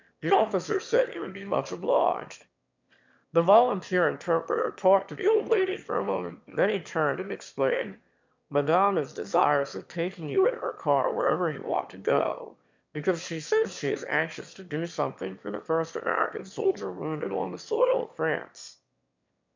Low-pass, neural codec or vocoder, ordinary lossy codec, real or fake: 7.2 kHz; autoencoder, 22.05 kHz, a latent of 192 numbers a frame, VITS, trained on one speaker; MP3, 64 kbps; fake